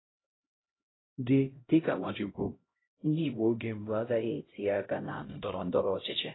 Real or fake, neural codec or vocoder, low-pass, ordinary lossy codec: fake; codec, 16 kHz, 0.5 kbps, X-Codec, HuBERT features, trained on LibriSpeech; 7.2 kHz; AAC, 16 kbps